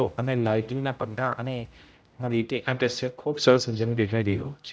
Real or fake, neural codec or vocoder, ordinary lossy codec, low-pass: fake; codec, 16 kHz, 0.5 kbps, X-Codec, HuBERT features, trained on general audio; none; none